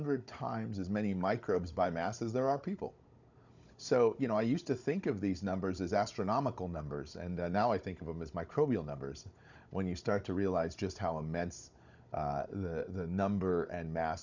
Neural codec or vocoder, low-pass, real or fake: codec, 16 kHz, 16 kbps, FunCodec, trained on Chinese and English, 50 frames a second; 7.2 kHz; fake